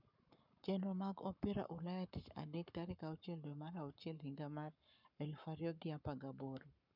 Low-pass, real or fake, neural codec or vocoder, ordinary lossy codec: 5.4 kHz; fake; codec, 44.1 kHz, 7.8 kbps, Pupu-Codec; none